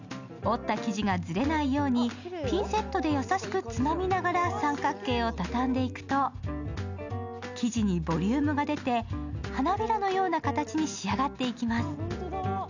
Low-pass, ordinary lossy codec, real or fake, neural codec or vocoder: 7.2 kHz; none; real; none